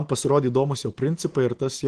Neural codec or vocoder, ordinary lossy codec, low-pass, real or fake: none; Opus, 16 kbps; 9.9 kHz; real